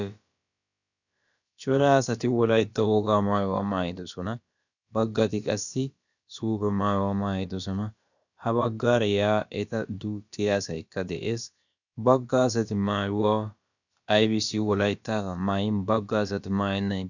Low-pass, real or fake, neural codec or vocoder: 7.2 kHz; fake; codec, 16 kHz, about 1 kbps, DyCAST, with the encoder's durations